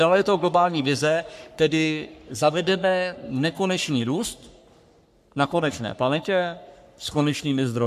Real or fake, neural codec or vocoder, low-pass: fake; codec, 44.1 kHz, 3.4 kbps, Pupu-Codec; 14.4 kHz